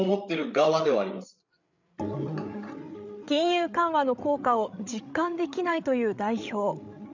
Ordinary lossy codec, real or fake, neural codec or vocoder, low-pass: none; fake; codec, 16 kHz, 8 kbps, FreqCodec, larger model; 7.2 kHz